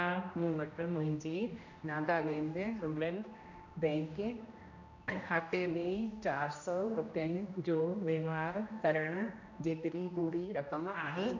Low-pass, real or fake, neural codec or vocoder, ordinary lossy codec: 7.2 kHz; fake; codec, 16 kHz, 1 kbps, X-Codec, HuBERT features, trained on general audio; none